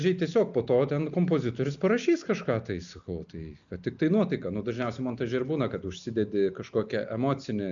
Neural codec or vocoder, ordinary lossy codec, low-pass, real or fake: none; MP3, 96 kbps; 7.2 kHz; real